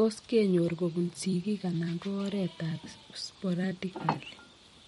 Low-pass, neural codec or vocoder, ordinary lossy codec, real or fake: 19.8 kHz; vocoder, 44.1 kHz, 128 mel bands every 256 samples, BigVGAN v2; MP3, 48 kbps; fake